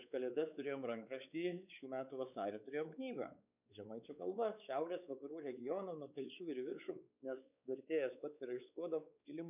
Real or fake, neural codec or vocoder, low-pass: fake; codec, 16 kHz, 4 kbps, X-Codec, WavLM features, trained on Multilingual LibriSpeech; 3.6 kHz